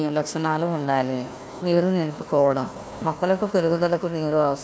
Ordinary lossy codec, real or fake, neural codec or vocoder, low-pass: none; fake; codec, 16 kHz, 1 kbps, FunCodec, trained on Chinese and English, 50 frames a second; none